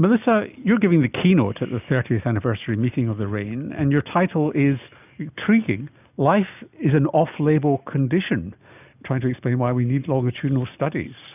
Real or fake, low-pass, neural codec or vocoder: real; 3.6 kHz; none